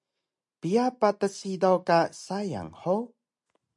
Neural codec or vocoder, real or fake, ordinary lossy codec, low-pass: none; real; MP3, 64 kbps; 10.8 kHz